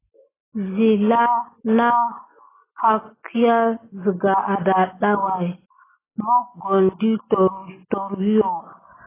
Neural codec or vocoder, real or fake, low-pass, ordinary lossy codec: none; real; 3.6 kHz; AAC, 16 kbps